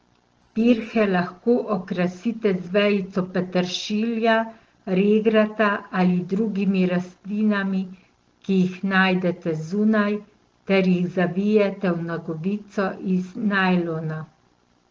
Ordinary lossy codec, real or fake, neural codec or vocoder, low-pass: Opus, 16 kbps; real; none; 7.2 kHz